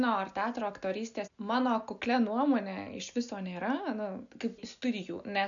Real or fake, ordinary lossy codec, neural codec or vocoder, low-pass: real; MP3, 96 kbps; none; 7.2 kHz